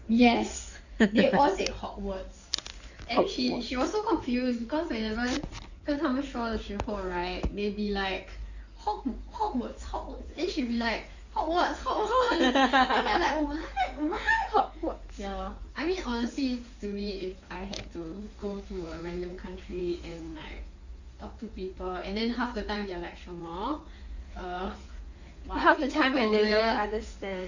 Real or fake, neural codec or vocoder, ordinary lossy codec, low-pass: fake; codec, 16 kHz in and 24 kHz out, 2.2 kbps, FireRedTTS-2 codec; none; 7.2 kHz